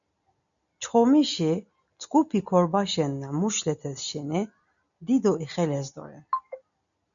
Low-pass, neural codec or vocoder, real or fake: 7.2 kHz; none; real